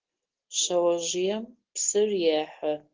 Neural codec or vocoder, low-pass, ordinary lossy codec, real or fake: none; 7.2 kHz; Opus, 16 kbps; real